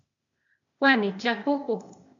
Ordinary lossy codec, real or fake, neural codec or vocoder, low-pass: MP3, 96 kbps; fake; codec, 16 kHz, 0.8 kbps, ZipCodec; 7.2 kHz